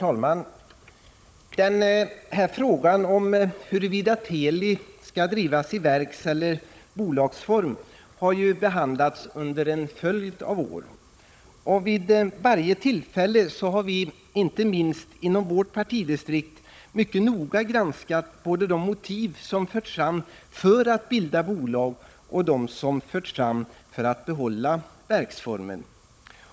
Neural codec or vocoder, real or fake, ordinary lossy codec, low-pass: codec, 16 kHz, 16 kbps, FunCodec, trained on Chinese and English, 50 frames a second; fake; none; none